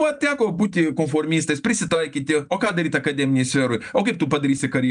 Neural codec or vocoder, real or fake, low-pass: none; real; 9.9 kHz